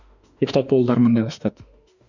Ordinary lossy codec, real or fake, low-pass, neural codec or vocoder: none; fake; 7.2 kHz; autoencoder, 48 kHz, 32 numbers a frame, DAC-VAE, trained on Japanese speech